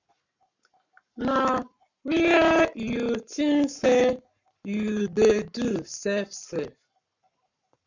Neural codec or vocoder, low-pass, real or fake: codec, 44.1 kHz, 7.8 kbps, Pupu-Codec; 7.2 kHz; fake